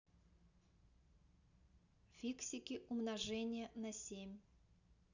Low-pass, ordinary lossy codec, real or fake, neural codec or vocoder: 7.2 kHz; none; real; none